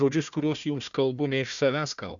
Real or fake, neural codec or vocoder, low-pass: fake; codec, 16 kHz, 1 kbps, FunCodec, trained on Chinese and English, 50 frames a second; 7.2 kHz